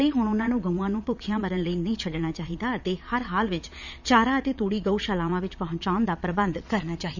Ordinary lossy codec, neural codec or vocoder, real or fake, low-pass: none; vocoder, 22.05 kHz, 80 mel bands, Vocos; fake; 7.2 kHz